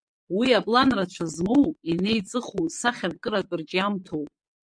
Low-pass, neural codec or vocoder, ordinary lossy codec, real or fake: 9.9 kHz; vocoder, 22.05 kHz, 80 mel bands, Vocos; MP3, 64 kbps; fake